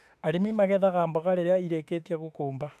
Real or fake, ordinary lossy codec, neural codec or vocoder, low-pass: fake; none; autoencoder, 48 kHz, 32 numbers a frame, DAC-VAE, trained on Japanese speech; 14.4 kHz